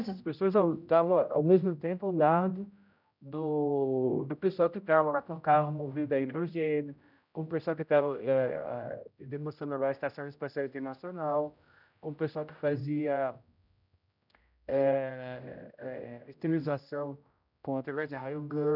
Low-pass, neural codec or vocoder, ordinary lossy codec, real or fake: 5.4 kHz; codec, 16 kHz, 0.5 kbps, X-Codec, HuBERT features, trained on general audio; none; fake